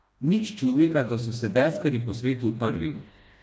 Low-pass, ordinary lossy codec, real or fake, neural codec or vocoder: none; none; fake; codec, 16 kHz, 1 kbps, FreqCodec, smaller model